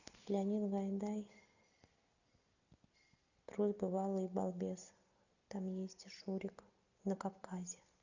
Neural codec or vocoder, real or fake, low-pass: none; real; 7.2 kHz